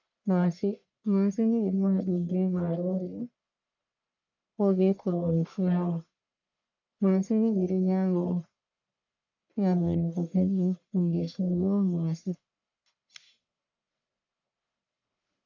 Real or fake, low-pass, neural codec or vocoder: fake; 7.2 kHz; codec, 44.1 kHz, 1.7 kbps, Pupu-Codec